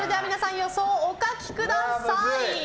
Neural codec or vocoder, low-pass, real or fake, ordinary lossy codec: none; none; real; none